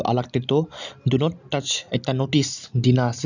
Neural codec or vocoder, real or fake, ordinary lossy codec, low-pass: none; real; none; 7.2 kHz